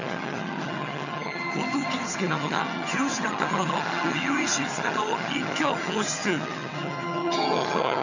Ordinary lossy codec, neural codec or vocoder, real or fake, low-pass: none; vocoder, 22.05 kHz, 80 mel bands, HiFi-GAN; fake; 7.2 kHz